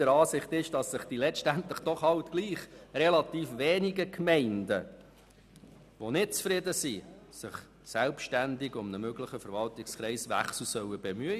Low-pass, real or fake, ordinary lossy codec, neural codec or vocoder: 14.4 kHz; real; none; none